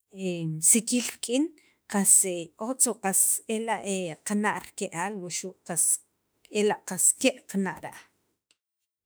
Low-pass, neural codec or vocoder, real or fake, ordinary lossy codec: none; autoencoder, 48 kHz, 32 numbers a frame, DAC-VAE, trained on Japanese speech; fake; none